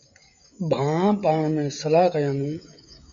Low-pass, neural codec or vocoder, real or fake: 7.2 kHz; codec, 16 kHz, 16 kbps, FreqCodec, smaller model; fake